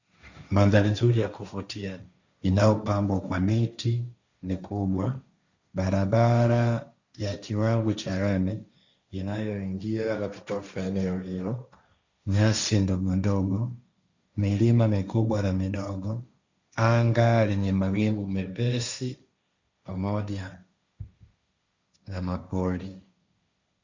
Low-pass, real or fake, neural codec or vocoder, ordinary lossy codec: 7.2 kHz; fake; codec, 16 kHz, 1.1 kbps, Voila-Tokenizer; Opus, 64 kbps